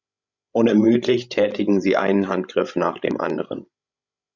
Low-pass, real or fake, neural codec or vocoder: 7.2 kHz; fake; codec, 16 kHz, 16 kbps, FreqCodec, larger model